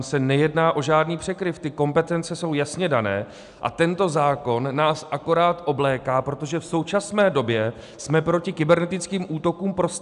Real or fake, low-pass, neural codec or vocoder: real; 10.8 kHz; none